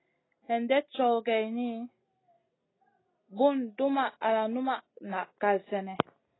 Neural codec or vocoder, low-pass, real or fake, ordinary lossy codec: none; 7.2 kHz; real; AAC, 16 kbps